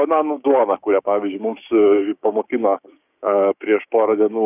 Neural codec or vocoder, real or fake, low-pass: codec, 16 kHz, 6 kbps, DAC; fake; 3.6 kHz